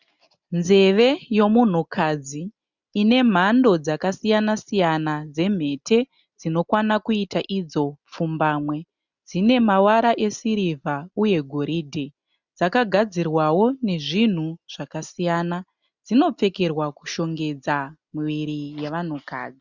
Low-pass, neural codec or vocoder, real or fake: 7.2 kHz; none; real